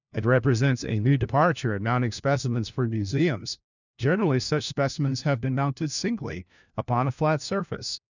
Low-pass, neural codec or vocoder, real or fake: 7.2 kHz; codec, 16 kHz, 1 kbps, FunCodec, trained on LibriTTS, 50 frames a second; fake